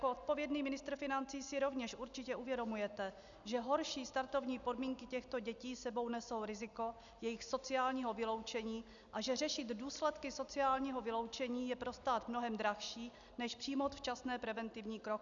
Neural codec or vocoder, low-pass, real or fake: none; 7.2 kHz; real